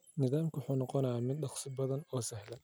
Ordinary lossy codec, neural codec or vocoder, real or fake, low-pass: none; none; real; none